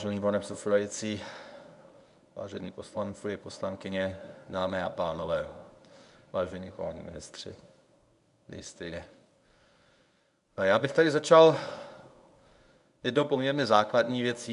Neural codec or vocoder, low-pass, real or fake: codec, 24 kHz, 0.9 kbps, WavTokenizer, medium speech release version 1; 10.8 kHz; fake